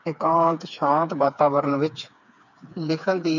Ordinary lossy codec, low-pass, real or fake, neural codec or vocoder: none; 7.2 kHz; fake; codec, 16 kHz, 4 kbps, FreqCodec, smaller model